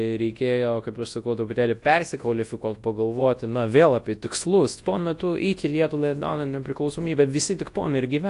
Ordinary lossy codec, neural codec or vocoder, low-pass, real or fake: AAC, 48 kbps; codec, 24 kHz, 0.9 kbps, WavTokenizer, large speech release; 10.8 kHz; fake